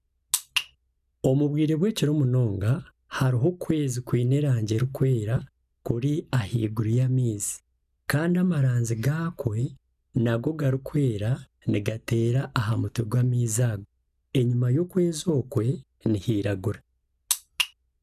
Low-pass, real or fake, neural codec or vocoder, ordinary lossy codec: 14.4 kHz; real; none; none